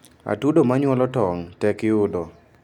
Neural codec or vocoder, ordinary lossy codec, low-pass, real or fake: none; none; 19.8 kHz; real